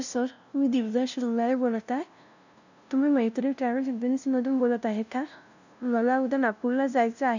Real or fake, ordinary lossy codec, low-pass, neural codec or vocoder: fake; none; 7.2 kHz; codec, 16 kHz, 0.5 kbps, FunCodec, trained on LibriTTS, 25 frames a second